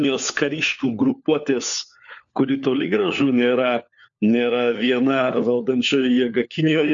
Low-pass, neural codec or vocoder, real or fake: 7.2 kHz; codec, 16 kHz, 4 kbps, FunCodec, trained on LibriTTS, 50 frames a second; fake